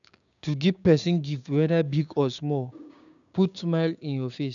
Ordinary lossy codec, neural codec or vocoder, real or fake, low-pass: none; codec, 16 kHz, 6 kbps, DAC; fake; 7.2 kHz